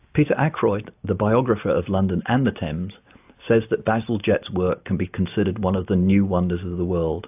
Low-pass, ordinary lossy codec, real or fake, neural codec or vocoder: 3.6 kHz; AAC, 32 kbps; real; none